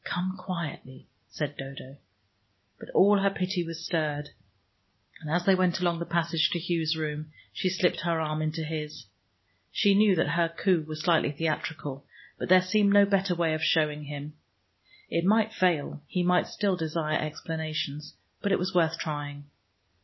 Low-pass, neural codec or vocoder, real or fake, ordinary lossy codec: 7.2 kHz; none; real; MP3, 24 kbps